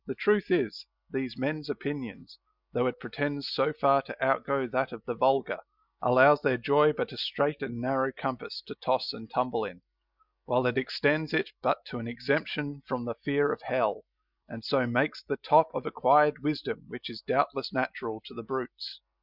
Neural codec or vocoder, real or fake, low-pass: none; real; 5.4 kHz